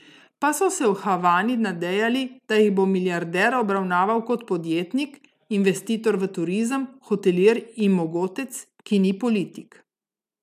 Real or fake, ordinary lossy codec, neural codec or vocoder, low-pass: real; none; none; 14.4 kHz